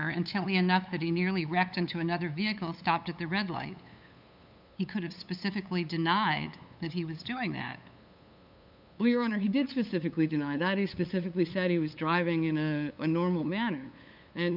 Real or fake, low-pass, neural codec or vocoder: fake; 5.4 kHz; codec, 16 kHz, 8 kbps, FunCodec, trained on LibriTTS, 25 frames a second